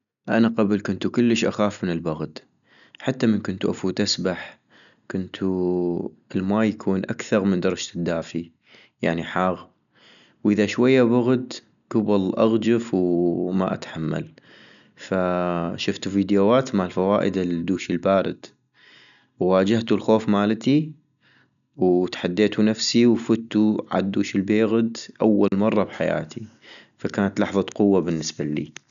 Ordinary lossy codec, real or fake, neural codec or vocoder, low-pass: none; real; none; 7.2 kHz